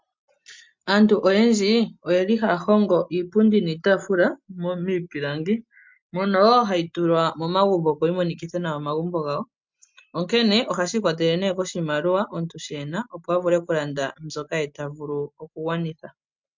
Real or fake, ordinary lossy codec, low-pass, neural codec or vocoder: real; MP3, 64 kbps; 7.2 kHz; none